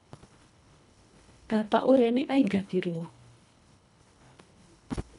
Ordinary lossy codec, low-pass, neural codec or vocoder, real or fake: none; 10.8 kHz; codec, 24 kHz, 1.5 kbps, HILCodec; fake